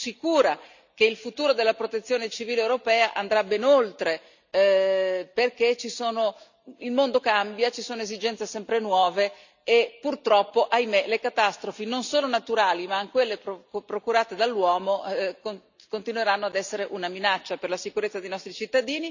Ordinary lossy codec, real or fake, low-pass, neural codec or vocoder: none; real; 7.2 kHz; none